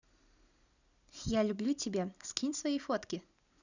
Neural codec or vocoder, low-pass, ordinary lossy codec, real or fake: none; 7.2 kHz; none; real